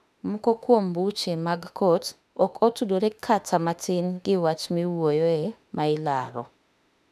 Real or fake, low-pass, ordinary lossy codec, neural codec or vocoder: fake; 14.4 kHz; none; autoencoder, 48 kHz, 32 numbers a frame, DAC-VAE, trained on Japanese speech